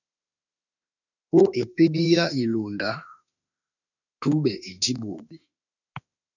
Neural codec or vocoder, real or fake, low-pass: autoencoder, 48 kHz, 32 numbers a frame, DAC-VAE, trained on Japanese speech; fake; 7.2 kHz